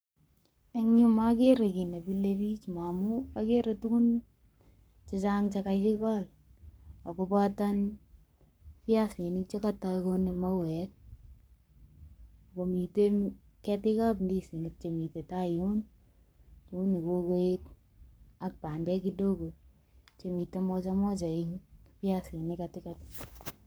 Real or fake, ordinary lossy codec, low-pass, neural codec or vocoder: fake; none; none; codec, 44.1 kHz, 7.8 kbps, Pupu-Codec